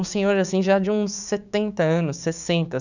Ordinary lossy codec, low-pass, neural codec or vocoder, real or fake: none; 7.2 kHz; codec, 16 kHz, 6 kbps, DAC; fake